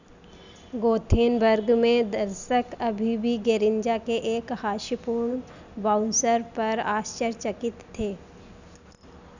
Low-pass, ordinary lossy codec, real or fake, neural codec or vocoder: 7.2 kHz; none; real; none